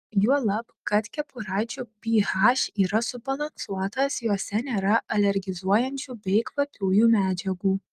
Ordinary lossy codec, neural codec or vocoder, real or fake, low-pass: Opus, 32 kbps; none; real; 14.4 kHz